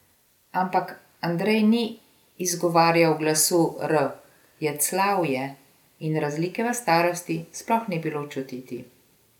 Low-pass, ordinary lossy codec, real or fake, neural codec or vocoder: 19.8 kHz; none; real; none